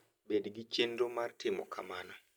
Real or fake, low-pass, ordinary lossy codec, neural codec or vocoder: real; none; none; none